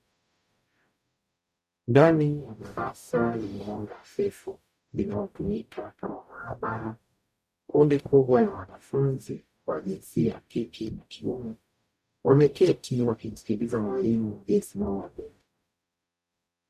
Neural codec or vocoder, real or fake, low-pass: codec, 44.1 kHz, 0.9 kbps, DAC; fake; 14.4 kHz